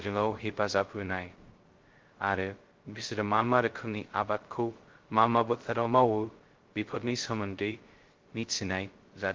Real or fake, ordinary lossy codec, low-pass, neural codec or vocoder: fake; Opus, 16 kbps; 7.2 kHz; codec, 16 kHz, 0.2 kbps, FocalCodec